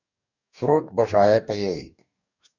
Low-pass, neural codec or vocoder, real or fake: 7.2 kHz; codec, 44.1 kHz, 2.6 kbps, DAC; fake